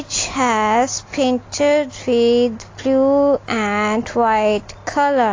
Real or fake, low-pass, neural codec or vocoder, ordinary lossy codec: real; 7.2 kHz; none; AAC, 32 kbps